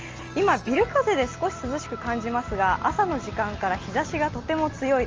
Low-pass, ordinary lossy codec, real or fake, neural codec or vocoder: 7.2 kHz; Opus, 24 kbps; real; none